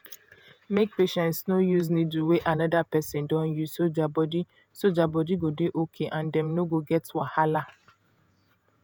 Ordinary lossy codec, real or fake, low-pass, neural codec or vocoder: none; fake; none; vocoder, 48 kHz, 128 mel bands, Vocos